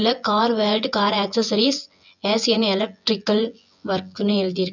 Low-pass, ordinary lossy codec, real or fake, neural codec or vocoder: 7.2 kHz; none; fake; vocoder, 24 kHz, 100 mel bands, Vocos